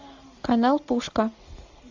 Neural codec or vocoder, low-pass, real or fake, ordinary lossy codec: none; 7.2 kHz; real; AAC, 48 kbps